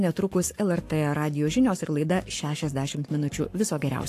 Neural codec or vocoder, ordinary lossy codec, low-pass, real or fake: codec, 44.1 kHz, 7.8 kbps, Pupu-Codec; AAC, 64 kbps; 14.4 kHz; fake